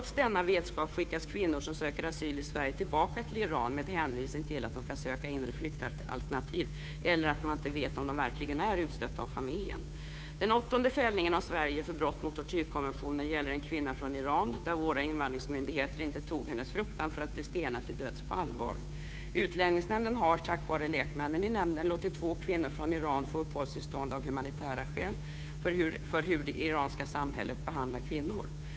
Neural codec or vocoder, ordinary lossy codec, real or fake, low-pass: codec, 16 kHz, 2 kbps, FunCodec, trained on Chinese and English, 25 frames a second; none; fake; none